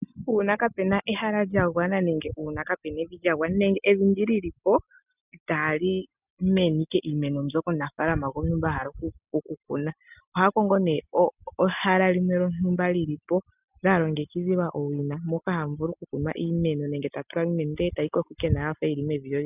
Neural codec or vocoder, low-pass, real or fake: none; 3.6 kHz; real